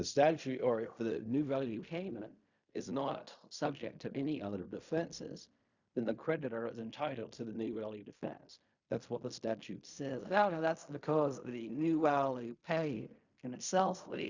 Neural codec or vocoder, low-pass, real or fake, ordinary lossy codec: codec, 16 kHz in and 24 kHz out, 0.4 kbps, LongCat-Audio-Codec, fine tuned four codebook decoder; 7.2 kHz; fake; Opus, 64 kbps